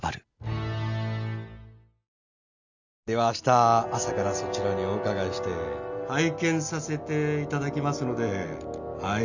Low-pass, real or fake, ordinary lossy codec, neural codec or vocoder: 7.2 kHz; real; none; none